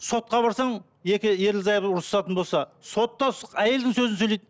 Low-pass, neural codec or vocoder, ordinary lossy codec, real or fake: none; none; none; real